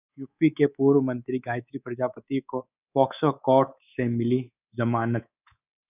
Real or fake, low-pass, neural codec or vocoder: fake; 3.6 kHz; codec, 24 kHz, 3.1 kbps, DualCodec